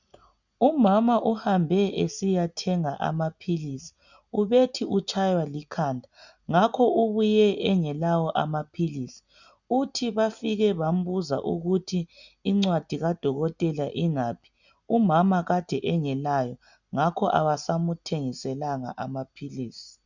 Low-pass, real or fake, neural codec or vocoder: 7.2 kHz; real; none